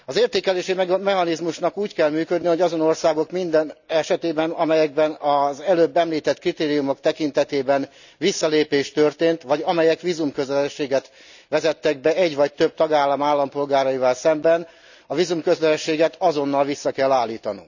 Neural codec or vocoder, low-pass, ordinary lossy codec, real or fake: none; 7.2 kHz; none; real